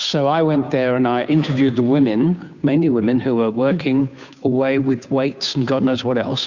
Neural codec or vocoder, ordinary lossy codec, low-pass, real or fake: codec, 16 kHz, 2 kbps, FunCodec, trained on Chinese and English, 25 frames a second; Opus, 64 kbps; 7.2 kHz; fake